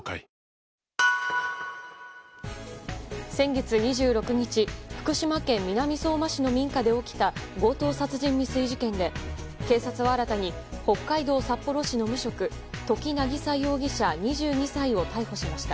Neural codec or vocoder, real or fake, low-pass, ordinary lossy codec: none; real; none; none